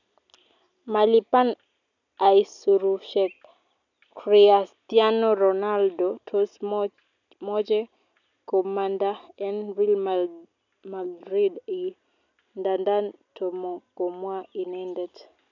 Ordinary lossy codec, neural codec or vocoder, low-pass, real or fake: none; none; 7.2 kHz; real